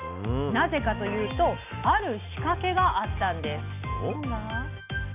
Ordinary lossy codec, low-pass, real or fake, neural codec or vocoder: none; 3.6 kHz; real; none